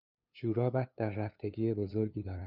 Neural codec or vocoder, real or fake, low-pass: codec, 16 kHz, 2 kbps, FunCodec, trained on LibriTTS, 25 frames a second; fake; 5.4 kHz